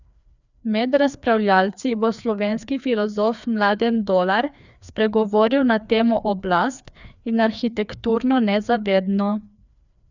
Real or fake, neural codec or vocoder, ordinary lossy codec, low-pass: fake; codec, 16 kHz, 2 kbps, FreqCodec, larger model; none; 7.2 kHz